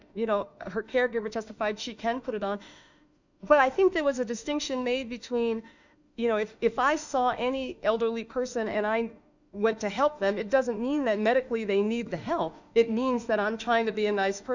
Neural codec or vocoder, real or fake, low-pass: autoencoder, 48 kHz, 32 numbers a frame, DAC-VAE, trained on Japanese speech; fake; 7.2 kHz